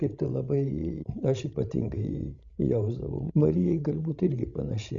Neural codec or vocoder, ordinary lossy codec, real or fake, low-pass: codec, 16 kHz, 16 kbps, FreqCodec, larger model; Opus, 64 kbps; fake; 7.2 kHz